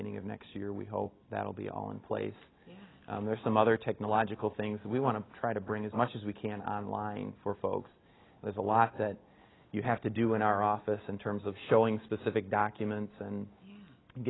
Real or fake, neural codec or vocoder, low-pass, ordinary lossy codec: real; none; 7.2 kHz; AAC, 16 kbps